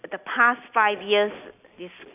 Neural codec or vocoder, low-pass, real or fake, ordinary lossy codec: none; 3.6 kHz; real; none